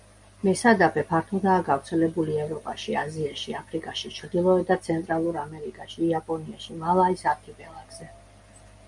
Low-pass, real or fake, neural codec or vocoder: 10.8 kHz; real; none